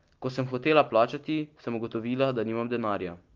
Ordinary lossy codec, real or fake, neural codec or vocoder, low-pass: Opus, 32 kbps; real; none; 7.2 kHz